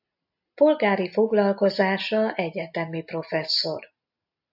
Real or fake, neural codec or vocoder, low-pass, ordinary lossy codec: real; none; 5.4 kHz; AAC, 48 kbps